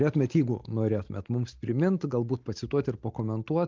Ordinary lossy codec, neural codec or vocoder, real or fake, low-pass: Opus, 32 kbps; none; real; 7.2 kHz